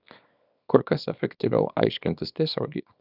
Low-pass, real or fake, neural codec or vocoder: 5.4 kHz; fake; codec, 24 kHz, 0.9 kbps, WavTokenizer, small release